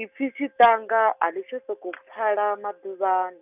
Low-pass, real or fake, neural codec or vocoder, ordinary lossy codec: 3.6 kHz; real; none; none